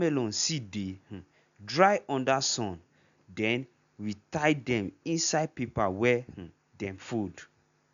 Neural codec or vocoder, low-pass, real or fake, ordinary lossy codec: none; 7.2 kHz; real; none